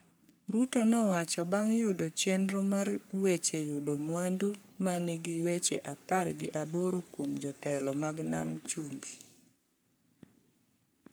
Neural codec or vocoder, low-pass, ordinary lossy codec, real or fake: codec, 44.1 kHz, 3.4 kbps, Pupu-Codec; none; none; fake